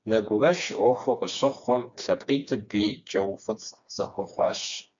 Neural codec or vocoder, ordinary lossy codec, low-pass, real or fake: codec, 16 kHz, 2 kbps, FreqCodec, smaller model; MP3, 64 kbps; 7.2 kHz; fake